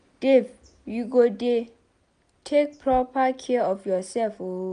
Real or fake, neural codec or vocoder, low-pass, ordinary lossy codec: real; none; 9.9 kHz; none